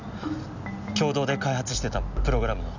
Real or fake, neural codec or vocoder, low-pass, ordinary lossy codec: real; none; 7.2 kHz; none